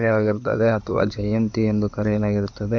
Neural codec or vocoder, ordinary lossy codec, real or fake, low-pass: codec, 16 kHz in and 24 kHz out, 2.2 kbps, FireRedTTS-2 codec; none; fake; 7.2 kHz